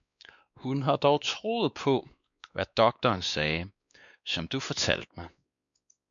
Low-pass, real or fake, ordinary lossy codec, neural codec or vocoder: 7.2 kHz; fake; MP3, 96 kbps; codec, 16 kHz, 2 kbps, X-Codec, WavLM features, trained on Multilingual LibriSpeech